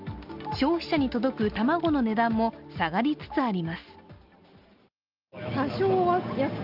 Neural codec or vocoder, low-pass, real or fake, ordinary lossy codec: none; 5.4 kHz; real; Opus, 32 kbps